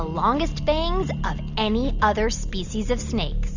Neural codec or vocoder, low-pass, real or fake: none; 7.2 kHz; real